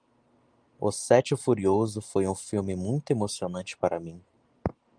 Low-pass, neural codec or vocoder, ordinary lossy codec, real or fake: 9.9 kHz; none; Opus, 32 kbps; real